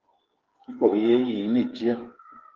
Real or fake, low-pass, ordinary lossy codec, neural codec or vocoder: fake; 7.2 kHz; Opus, 16 kbps; codec, 16 kHz, 16 kbps, FreqCodec, smaller model